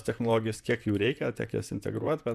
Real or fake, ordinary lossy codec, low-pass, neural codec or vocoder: fake; MP3, 96 kbps; 14.4 kHz; vocoder, 44.1 kHz, 128 mel bands, Pupu-Vocoder